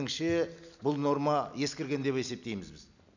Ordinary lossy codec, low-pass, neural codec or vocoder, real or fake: none; 7.2 kHz; none; real